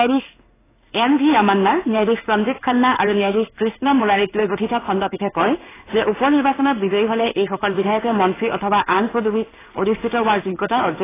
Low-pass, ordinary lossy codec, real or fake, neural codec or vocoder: 3.6 kHz; AAC, 16 kbps; fake; codec, 16 kHz in and 24 kHz out, 1 kbps, XY-Tokenizer